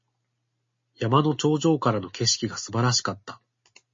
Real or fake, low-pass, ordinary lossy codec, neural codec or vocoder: real; 7.2 kHz; MP3, 32 kbps; none